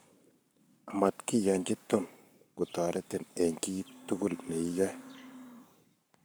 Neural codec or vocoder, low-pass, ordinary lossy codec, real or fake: codec, 44.1 kHz, 7.8 kbps, Pupu-Codec; none; none; fake